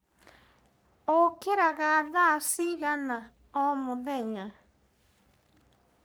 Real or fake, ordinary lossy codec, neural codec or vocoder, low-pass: fake; none; codec, 44.1 kHz, 3.4 kbps, Pupu-Codec; none